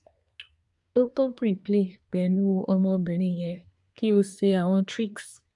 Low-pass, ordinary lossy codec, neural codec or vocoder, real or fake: 10.8 kHz; none; codec, 24 kHz, 1 kbps, SNAC; fake